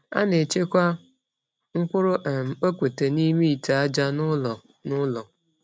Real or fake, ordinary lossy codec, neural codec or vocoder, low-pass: real; none; none; none